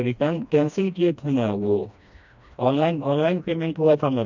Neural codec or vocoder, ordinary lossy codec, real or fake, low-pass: codec, 16 kHz, 1 kbps, FreqCodec, smaller model; MP3, 64 kbps; fake; 7.2 kHz